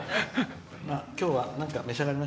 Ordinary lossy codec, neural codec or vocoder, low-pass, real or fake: none; none; none; real